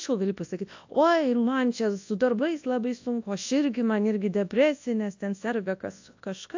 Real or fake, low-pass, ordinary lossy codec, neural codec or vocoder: fake; 7.2 kHz; AAC, 48 kbps; codec, 24 kHz, 0.9 kbps, WavTokenizer, large speech release